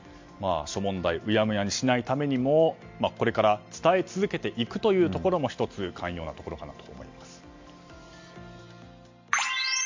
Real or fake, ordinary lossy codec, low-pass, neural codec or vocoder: real; MP3, 48 kbps; 7.2 kHz; none